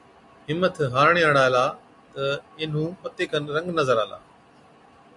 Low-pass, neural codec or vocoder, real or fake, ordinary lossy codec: 10.8 kHz; none; real; MP3, 64 kbps